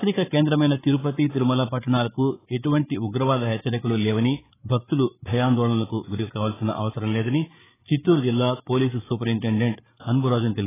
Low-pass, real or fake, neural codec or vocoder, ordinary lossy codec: 3.6 kHz; fake; codec, 16 kHz, 8 kbps, FreqCodec, larger model; AAC, 16 kbps